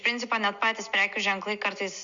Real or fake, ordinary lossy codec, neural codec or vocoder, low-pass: real; Opus, 64 kbps; none; 7.2 kHz